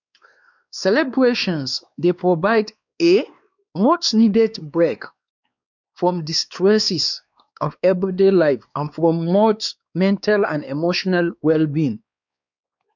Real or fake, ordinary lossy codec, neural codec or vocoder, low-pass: fake; none; codec, 16 kHz, 2 kbps, X-Codec, WavLM features, trained on Multilingual LibriSpeech; 7.2 kHz